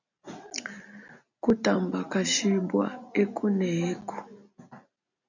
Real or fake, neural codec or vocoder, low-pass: real; none; 7.2 kHz